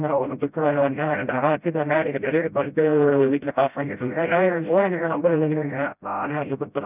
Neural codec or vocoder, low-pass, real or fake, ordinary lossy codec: codec, 16 kHz, 0.5 kbps, FreqCodec, smaller model; 3.6 kHz; fake; none